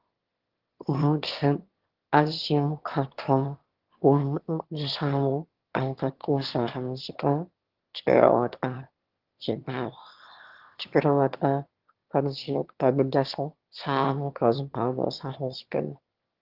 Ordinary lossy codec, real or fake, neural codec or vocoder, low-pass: Opus, 16 kbps; fake; autoencoder, 22.05 kHz, a latent of 192 numbers a frame, VITS, trained on one speaker; 5.4 kHz